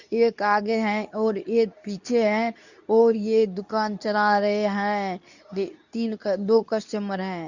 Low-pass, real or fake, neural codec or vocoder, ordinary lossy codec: 7.2 kHz; fake; codec, 24 kHz, 0.9 kbps, WavTokenizer, medium speech release version 2; none